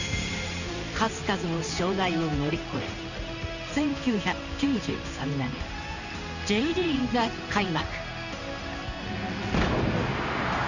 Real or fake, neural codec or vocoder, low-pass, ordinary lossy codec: fake; codec, 16 kHz in and 24 kHz out, 1 kbps, XY-Tokenizer; 7.2 kHz; none